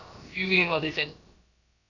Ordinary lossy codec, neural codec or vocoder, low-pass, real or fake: none; codec, 16 kHz, about 1 kbps, DyCAST, with the encoder's durations; 7.2 kHz; fake